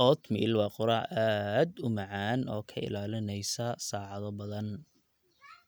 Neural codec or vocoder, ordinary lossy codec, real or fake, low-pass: none; none; real; none